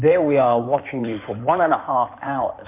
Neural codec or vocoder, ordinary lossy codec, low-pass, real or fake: codec, 16 kHz in and 24 kHz out, 2.2 kbps, FireRedTTS-2 codec; MP3, 24 kbps; 3.6 kHz; fake